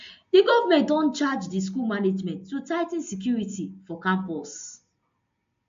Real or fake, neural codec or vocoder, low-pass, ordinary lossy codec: real; none; 7.2 kHz; MP3, 48 kbps